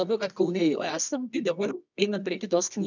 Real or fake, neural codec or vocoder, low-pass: fake; codec, 24 kHz, 0.9 kbps, WavTokenizer, medium music audio release; 7.2 kHz